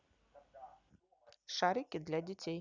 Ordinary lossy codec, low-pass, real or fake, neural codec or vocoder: none; 7.2 kHz; real; none